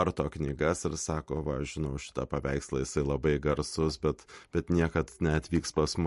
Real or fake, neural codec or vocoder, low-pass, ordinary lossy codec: real; none; 14.4 kHz; MP3, 48 kbps